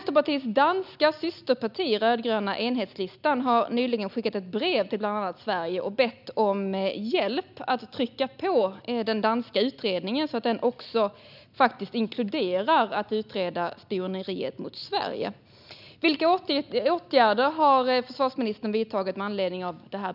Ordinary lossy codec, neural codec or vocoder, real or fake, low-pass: none; none; real; 5.4 kHz